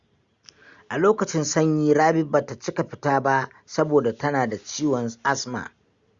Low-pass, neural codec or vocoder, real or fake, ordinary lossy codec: 7.2 kHz; none; real; Opus, 64 kbps